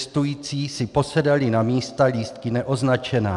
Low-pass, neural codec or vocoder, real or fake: 10.8 kHz; none; real